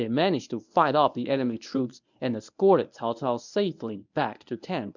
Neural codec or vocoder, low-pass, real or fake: codec, 24 kHz, 0.9 kbps, WavTokenizer, medium speech release version 1; 7.2 kHz; fake